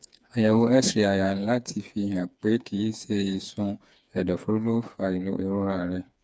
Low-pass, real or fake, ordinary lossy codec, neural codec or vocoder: none; fake; none; codec, 16 kHz, 4 kbps, FreqCodec, smaller model